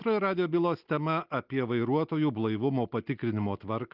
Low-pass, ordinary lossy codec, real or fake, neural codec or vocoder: 5.4 kHz; Opus, 32 kbps; real; none